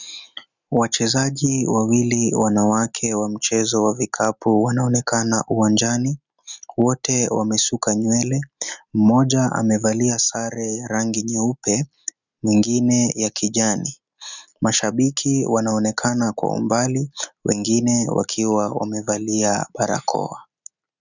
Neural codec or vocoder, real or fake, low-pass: none; real; 7.2 kHz